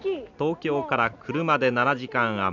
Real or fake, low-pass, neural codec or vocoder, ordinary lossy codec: real; 7.2 kHz; none; none